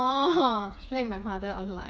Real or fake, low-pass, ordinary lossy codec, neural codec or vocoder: fake; none; none; codec, 16 kHz, 4 kbps, FreqCodec, smaller model